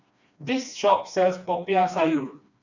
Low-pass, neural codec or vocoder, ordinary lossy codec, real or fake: 7.2 kHz; codec, 16 kHz, 2 kbps, FreqCodec, smaller model; none; fake